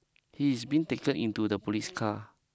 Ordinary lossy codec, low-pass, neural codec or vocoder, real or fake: none; none; none; real